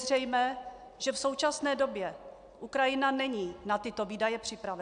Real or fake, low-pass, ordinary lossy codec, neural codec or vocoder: real; 9.9 kHz; AAC, 96 kbps; none